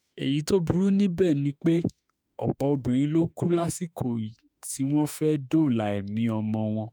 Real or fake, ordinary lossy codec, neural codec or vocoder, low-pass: fake; none; autoencoder, 48 kHz, 32 numbers a frame, DAC-VAE, trained on Japanese speech; none